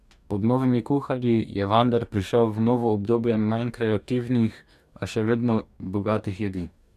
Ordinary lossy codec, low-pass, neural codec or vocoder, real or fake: none; 14.4 kHz; codec, 44.1 kHz, 2.6 kbps, DAC; fake